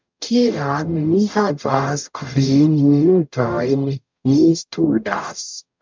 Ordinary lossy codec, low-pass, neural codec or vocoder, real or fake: MP3, 64 kbps; 7.2 kHz; codec, 44.1 kHz, 0.9 kbps, DAC; fake